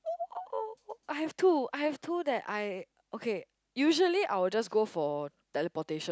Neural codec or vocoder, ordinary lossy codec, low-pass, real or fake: none; none; none; real